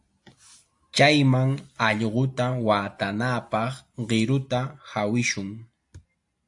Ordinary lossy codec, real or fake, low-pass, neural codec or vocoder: AAC, 64 kbps; real; 10.8 kHz; none